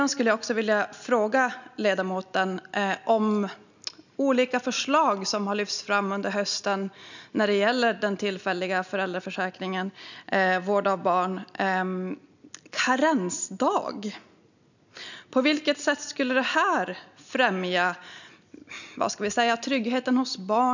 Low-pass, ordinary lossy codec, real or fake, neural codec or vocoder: 7.2 kHz; none; real; none